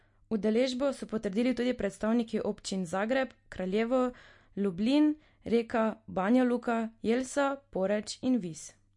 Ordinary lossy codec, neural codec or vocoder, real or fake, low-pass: MP3, 48 kbps; none; real; 10.8 kHz